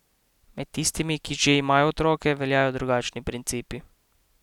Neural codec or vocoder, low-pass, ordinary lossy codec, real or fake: none; 19.8 kHz; none; real